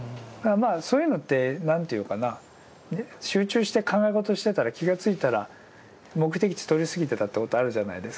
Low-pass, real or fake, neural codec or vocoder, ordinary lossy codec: none; real; none; none